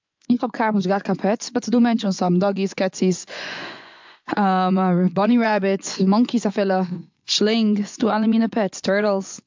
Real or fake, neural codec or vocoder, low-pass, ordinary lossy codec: fake; vocoder, 24 kHz, 100 mel bands, Vocos; 7.2 kHz; MP3, 64 kbps